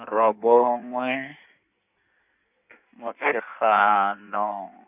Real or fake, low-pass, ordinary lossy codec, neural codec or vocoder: fake; 3.6 kHz; none; codec, 16 kHz in and 24 kHz out, 1.1 kbps, FireRedTTS-2 codec